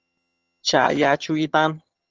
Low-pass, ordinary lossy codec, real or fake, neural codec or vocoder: 7.2 kHz; Opus, 24 kbps; fake; vocoder, 22.05 kHz, 80 mel bands, HiFi-GAN